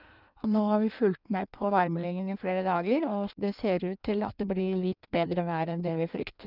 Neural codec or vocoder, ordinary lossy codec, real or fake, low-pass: codec, 16 kHz in and 24 kHz out, 1.1 kbps, FireRedTTS-2 codec; none; fake; 5.4 kHz